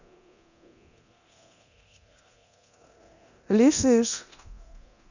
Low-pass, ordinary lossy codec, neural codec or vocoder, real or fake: 7.2 kHz; none; codec, 24 kHz, 0.9 kbps, DualCodec; fake